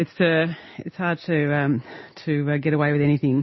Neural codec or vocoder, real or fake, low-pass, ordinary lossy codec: none; real; 7.2 kHz; MP3, 24 kbps